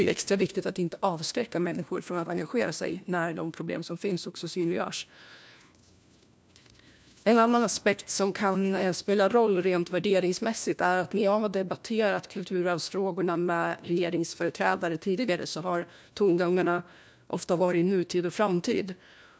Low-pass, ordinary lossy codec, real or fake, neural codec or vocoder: none; none; fake; codec, 16 kHz, 1 kbps, FunCodec, trained on LibriTTS, 50 frames a second